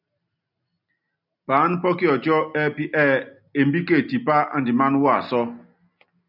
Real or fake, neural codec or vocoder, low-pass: real; none; 5.4 kHz